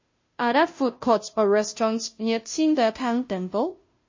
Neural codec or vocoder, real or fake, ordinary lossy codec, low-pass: codec, 16 kHz, 0.5 kbps, FunCodec, trained on Chinese and English, 25 frames a second; fake; MP3, 32 kbps; 7.2 kHz